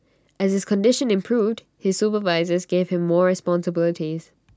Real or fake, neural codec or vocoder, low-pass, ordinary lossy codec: real; none; none; none